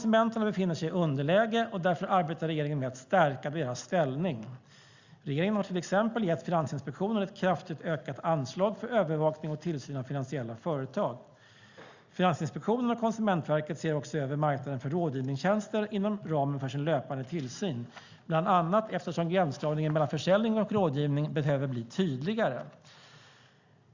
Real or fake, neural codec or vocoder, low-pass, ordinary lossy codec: real; none; 7.2 kHz; Opus, 64 kbps